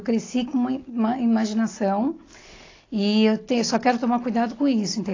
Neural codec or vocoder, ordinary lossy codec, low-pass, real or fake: none; AAC, 32 kbps; 7.2 kHz; real